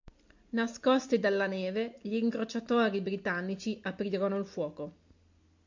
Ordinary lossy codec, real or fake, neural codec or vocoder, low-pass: MP3, 48 kbps; real; none; 7.2 kHz